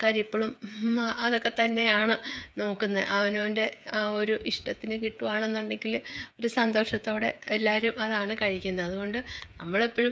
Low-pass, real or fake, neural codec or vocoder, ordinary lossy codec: none; fake; codec, 16 kHz, 8 kbps, FreqCodec, smaller model; none